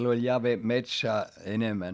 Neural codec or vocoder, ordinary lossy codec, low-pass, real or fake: none; none; none; real